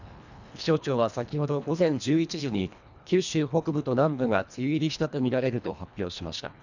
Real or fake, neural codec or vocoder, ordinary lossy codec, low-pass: fake; codec, 24 kHz, 1.5 kbps, HILCodec; none; 7.2 kHz